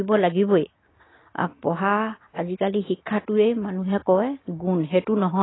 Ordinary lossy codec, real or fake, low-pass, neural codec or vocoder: AAC, 16 kbps; real; 7.2 kHz; none